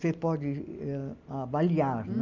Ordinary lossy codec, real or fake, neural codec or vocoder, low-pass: none; real; none; 7.2 kHz